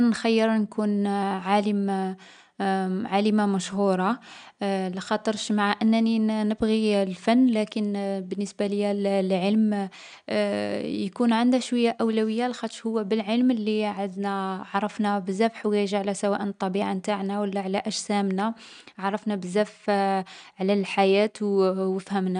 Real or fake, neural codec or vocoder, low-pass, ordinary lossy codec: real; none; 9.9 kHz; none